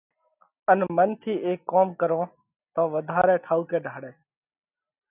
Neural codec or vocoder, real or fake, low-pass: none; real; 3.6 kHz